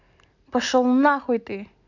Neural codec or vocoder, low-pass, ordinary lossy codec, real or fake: none; 7.2 kHz; none; real